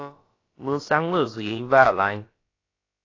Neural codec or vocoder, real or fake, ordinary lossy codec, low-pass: codec, 16 kHz, about 1 kbps, DyCAST, with the encoder's durations; fake; AAC, 32 kbps; 7.2 kHz